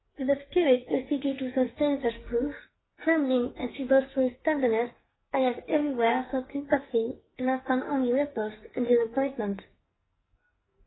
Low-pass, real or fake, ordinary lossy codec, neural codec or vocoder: 7.2 kHz; fake; AAC, 16 kbps; codec, 44.1 kHz, 2.6 kbps, SNAC